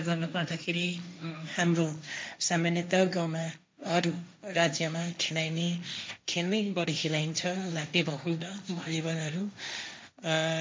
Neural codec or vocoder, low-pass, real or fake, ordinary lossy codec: codec, 16 kHz, 1.1 kbps, Voila-Tokenizer; none; fake; none